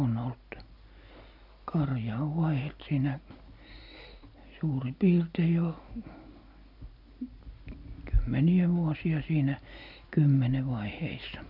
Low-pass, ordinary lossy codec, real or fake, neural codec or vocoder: 5.4 kHz; none; real; none